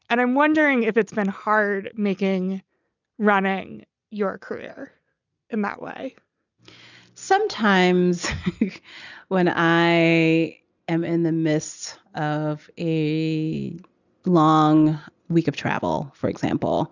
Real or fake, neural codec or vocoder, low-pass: real; none; 7.2 kHz